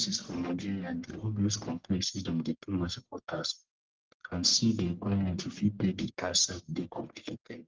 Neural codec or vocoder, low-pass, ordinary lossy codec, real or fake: codec, 44.1 kHz, 1.7 kbps, Pupu-Codec; 7.2 kHz; Opus, 16 kbps; fake